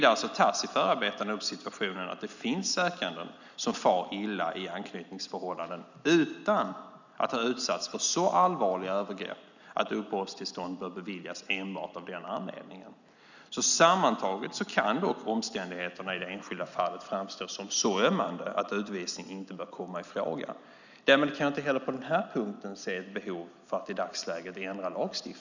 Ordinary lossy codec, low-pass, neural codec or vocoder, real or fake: none; 7.2 kHz; none; real